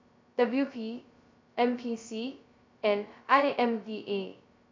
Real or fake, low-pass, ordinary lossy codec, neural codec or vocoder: fake; 7.2 kHz; MP3, 64 kbps; codec, 16 kHz, 0.2 kbps, FocalCodec